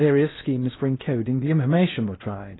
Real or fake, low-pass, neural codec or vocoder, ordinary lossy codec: fake; 7.2 kHz; codec, 16 kHz in and 24 kHz out, 0.8 kbps, FocalCodec, streaming, 65536 codes; AAC, 16 kbps